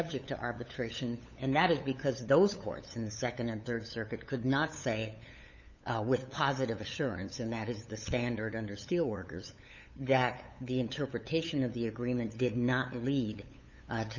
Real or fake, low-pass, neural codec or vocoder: fake; 7.2 kHz; codec, 16 kHz, 8 kbps, FunCodec, trained on LibriTTS, 25 frames a second